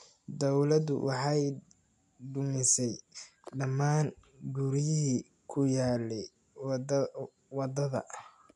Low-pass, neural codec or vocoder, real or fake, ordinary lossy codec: 10.8 kHz; none; real; none